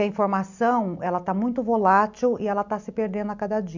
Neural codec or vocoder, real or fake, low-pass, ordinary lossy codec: none; real; 7.2 kHz; none